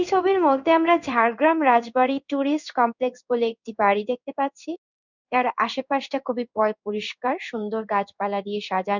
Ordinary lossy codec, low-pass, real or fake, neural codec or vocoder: none; 7.2 kHz; fake; codec, 16 kHz in and 24 kHz out, 1 kbps, XY-Tokenizer